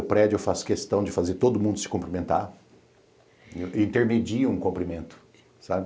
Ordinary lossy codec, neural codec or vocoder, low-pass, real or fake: none; none; none; real